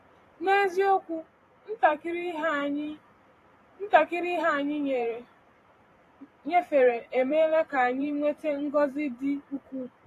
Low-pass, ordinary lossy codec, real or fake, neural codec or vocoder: 14.4 kHz; AAC, 48 kbps; fake; vocoder, 44.1 kHz, 128 mel bands every 512 samples, BigVGAN v2